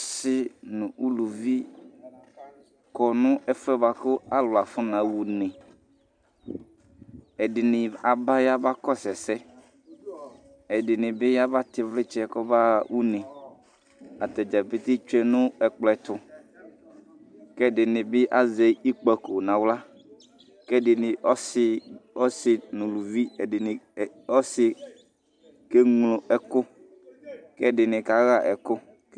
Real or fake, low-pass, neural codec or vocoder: real; 9.9 kHz; none